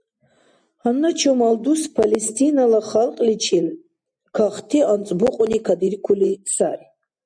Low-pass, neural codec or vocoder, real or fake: 9.9 kHz; none; real